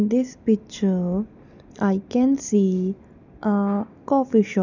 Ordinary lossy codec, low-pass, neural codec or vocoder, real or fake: none; 7.2 kHz; none; real